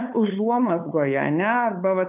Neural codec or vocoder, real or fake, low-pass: codec, 16 kHz, 8 kbps, FunCodec, trained on LibriTTS, 25 frames a second; fake; 3.6 kHz